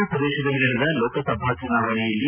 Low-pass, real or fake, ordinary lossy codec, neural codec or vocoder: 3.6 kHz; real; none; none